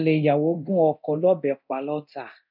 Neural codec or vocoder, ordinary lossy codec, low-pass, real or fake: codec, 24 kHz, 0.9 kbps, DualCodec; none; 5.4 kHz; fake